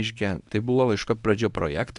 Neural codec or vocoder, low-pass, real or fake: codec, 24 kHz, 0.9 kbps, WavTokenizer, medium speech release version 1; 10.8 kHz; fake